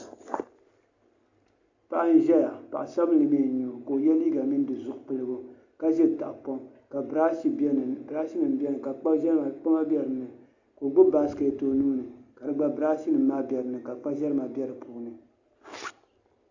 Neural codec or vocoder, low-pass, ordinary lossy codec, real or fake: none; 7.2 kHz; Opus, 64 kbps; real